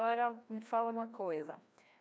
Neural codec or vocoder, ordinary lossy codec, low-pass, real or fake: codec, 16 kHz, 1 kbps, FreqCodec, larger model; none; none; fake